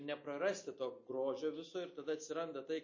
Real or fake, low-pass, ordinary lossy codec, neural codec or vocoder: real; 7.2 kHz; MP3, 32 kbps; none